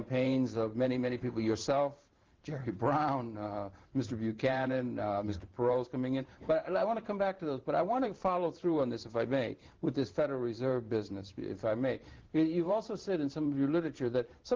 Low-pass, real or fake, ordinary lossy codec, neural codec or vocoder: 7.2 kHz; fake; Opus, 16 kbps; vocoder, 44.1 kHz, 128 mel bands every 512 samples, BigVGAN v2